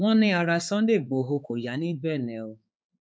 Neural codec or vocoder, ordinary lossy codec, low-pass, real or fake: codec, 16 kHz, 4 kbps, X-Codec, HuBERT features, trained on balanced general audio; none; none; fake